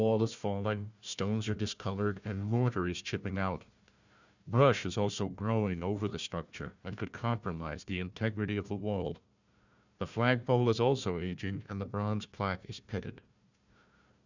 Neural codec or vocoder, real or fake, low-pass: codec, 16 kHz, 1 kbps, FunCodec, trained on Chinese and English, 50 frames a second; fake; 7.2 kHz